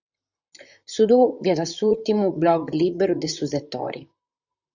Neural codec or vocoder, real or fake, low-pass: vocoder, 44.1 kHz, 128 mel bands, Pupu-Vocoder; fake; 7.2 kHz